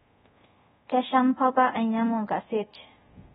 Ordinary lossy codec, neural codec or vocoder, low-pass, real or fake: AAC, 16 kbps; codec, 24 kHz, 0.5 kbps, DualCodec; 10.8 kHz; fake